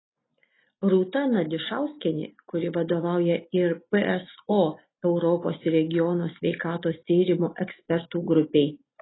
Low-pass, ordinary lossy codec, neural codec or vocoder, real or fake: 7.2 kHz; AAC, 16 kbps; none; real